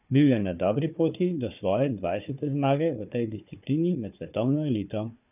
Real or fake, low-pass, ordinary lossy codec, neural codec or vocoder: fake; 3.6 kHz; none; codec, 16 kHz, 4 kbps, FunCodec, trained on Chinese and English, 50 frames a second